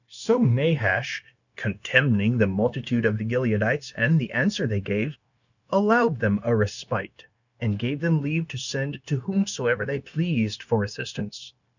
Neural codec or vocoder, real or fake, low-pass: codec, 16 kHz, 0.9 kbps, LongCat-Audio-Codec; fake; 7.2 kHz